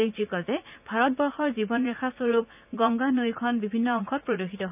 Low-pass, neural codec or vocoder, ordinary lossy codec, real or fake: 3.6 kHz; vocoder, 44.1 kHz, 80 mel bands, Vocos; none; fake